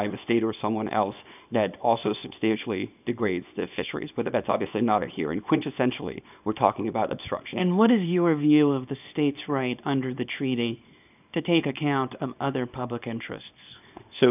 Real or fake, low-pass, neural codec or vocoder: fake; 3.6 kHz; codec, 24 kHz, 0.9 kbps, WavTokenizer, small release